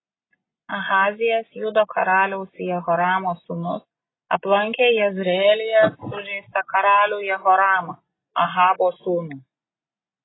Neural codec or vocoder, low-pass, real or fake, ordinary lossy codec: none; 7.2 kHz; real; AAC, 16 kbps